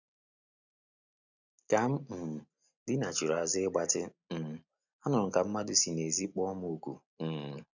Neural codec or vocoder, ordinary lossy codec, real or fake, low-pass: none; none; real; 7.2 kHz